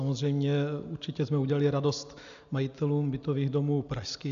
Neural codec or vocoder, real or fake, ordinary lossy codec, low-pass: none; real; AAC, 96 kbps; 7.2 kHz